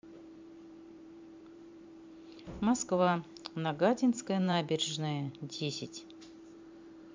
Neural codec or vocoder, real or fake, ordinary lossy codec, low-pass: vocoder, 44.1 kHz, 128 mel bands every 512 samples, BigVGAN v2; fake; MP3, 64 kbps; 7.2 kHz